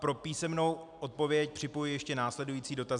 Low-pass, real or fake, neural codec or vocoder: 10.8 kHz; real; none